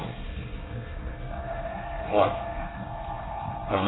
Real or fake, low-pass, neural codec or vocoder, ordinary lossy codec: fake; 7.2 kHz; codec, 24 kHz, 1 kbps, SNAC; AAC, 16 kbps